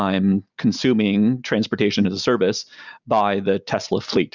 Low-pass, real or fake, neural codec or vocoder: 7.2 kHz; real; none